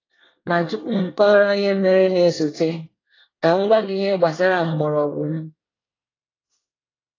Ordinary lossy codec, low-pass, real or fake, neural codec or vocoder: AAC, 32 kbps; 7.2 kHz; fake; codec, 24 kHz, 1 kbps, SNAC